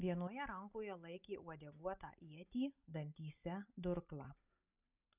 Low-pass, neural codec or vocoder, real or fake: 3.6 kHz; none; real